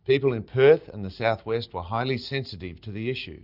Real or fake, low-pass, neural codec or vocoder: real; 5.4 kHz; none